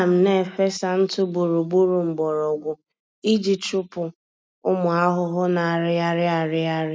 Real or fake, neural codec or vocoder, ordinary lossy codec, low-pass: real; none; none; none